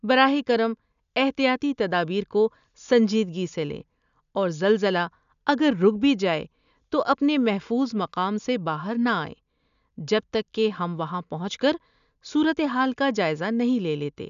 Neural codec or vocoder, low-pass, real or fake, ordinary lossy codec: none; 7.2 kHz; real; none